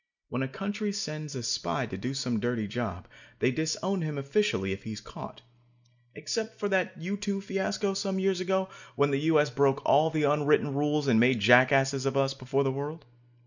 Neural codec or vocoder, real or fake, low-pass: none; real; 7.2 kHz